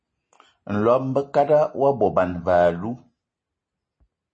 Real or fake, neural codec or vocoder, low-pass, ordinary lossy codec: real; none; 9.9 kHz; MP3, 32 kbps